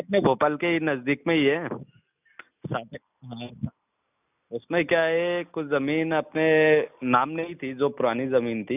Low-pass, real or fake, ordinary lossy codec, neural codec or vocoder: 3.6 kHz; real; none; none